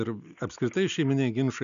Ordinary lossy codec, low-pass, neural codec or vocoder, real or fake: MP3, 96 kbps; 7.2 kHz; none; real